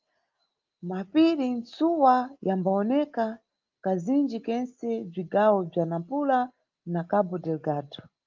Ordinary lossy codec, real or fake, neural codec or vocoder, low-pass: Opus, 24 kbps; real; none; 7.2 kHz